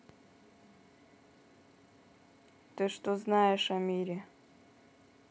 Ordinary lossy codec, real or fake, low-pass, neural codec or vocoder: none; real; none; none